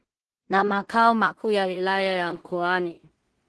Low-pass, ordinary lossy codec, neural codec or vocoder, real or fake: 10.8 kHz; Opus, 16 kbps; codec, 16 kHz in and 24 kHz out, 0.4 kbps, LongCat-Audio-Codec, two codebook decoder; fake